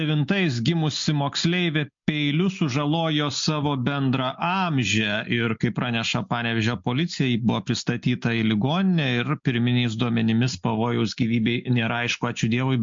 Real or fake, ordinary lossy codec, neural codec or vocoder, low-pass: real; MP3, 48 kbps; none; 7.2 kHz